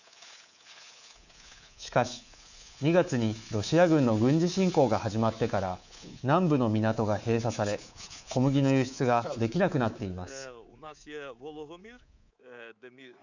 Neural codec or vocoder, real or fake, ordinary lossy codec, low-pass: codec, 24 kHz, 3.1 kbps, DualCodec; fake; none; 7.2 kHz